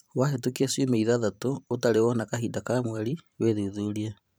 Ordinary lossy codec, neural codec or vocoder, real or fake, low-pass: none; vocoder, 44.1 kHz, 128 mel bands every 512 samples, BigVGAN v2; fake; none